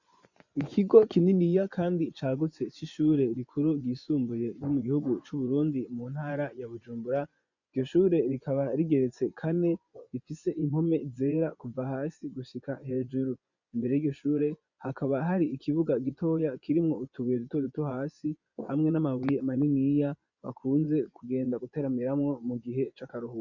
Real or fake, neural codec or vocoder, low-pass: fake; vocoder, 24 kHz, 100 mel bands, Vocos; 7.2 kHz